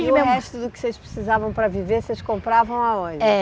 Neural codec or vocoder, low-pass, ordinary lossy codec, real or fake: none; none; none; real